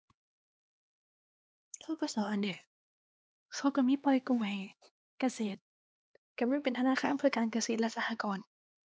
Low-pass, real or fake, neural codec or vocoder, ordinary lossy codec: none; fake; codec, 16 kHz, 2 kbps, X-Codec, HuBERT features, trained on LibriSpeech; none